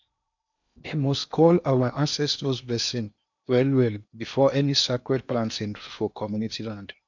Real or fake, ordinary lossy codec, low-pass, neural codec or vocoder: fake; none; 7.2 kHz; codec, 16 kHz in and 24 kHz out, 0.8 kbps, FocalCodec, streaming, 65536 codes